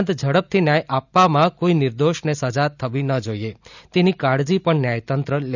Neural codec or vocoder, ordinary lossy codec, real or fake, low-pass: none; none; real; 7.2 kHz